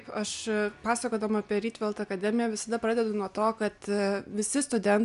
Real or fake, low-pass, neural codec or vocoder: real; 10.8 kHz; none